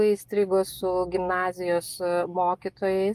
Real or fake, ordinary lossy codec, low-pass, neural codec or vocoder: real; Opus, 24 kbps; 14.4 kHz; none